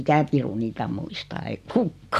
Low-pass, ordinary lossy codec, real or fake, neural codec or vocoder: 14.4 kHz; none; real; none